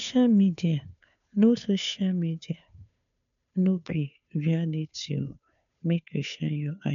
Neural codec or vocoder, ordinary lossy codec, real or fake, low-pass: codec, 16 kHz, 2 kbps, FunCodec, trained on Chinese and English, 25 frames a second; none; fake; 7.2 kHz